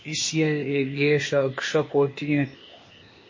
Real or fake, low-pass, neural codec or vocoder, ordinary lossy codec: fake; 7.2 kHz; codec, 16 kHz, 0.8 kbps, ZipCodec; MP3, 32 kbps